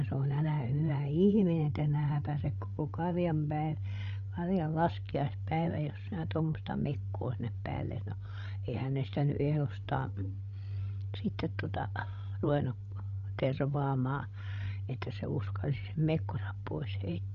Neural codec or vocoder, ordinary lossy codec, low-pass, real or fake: codec, 16 kHz, 16 kbps, FreqCodec, larger model; none; 7.2 kHz; fake